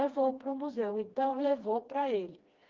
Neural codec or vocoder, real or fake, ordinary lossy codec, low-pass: codec, 16 kHz, 2 kbps, FreqCodec, smaller model; fake; Opus, 24 kbps; 7.2 kHz